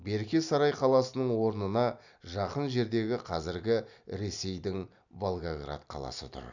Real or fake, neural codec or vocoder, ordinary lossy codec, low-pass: real; none; none; 7.2 kHz